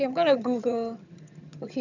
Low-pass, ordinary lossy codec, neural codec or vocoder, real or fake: 7.2 kHz; none; vocoder, 22.05 kHz, 80 mel bands, HiFi-GAN; fake